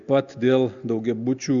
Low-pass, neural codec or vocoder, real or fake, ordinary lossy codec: 7.2 kHz; none; real; MP3, 96 kbps